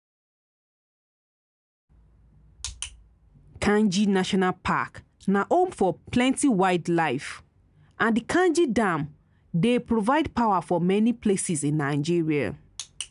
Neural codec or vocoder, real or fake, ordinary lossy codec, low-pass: none; real; none; 10.8 kHz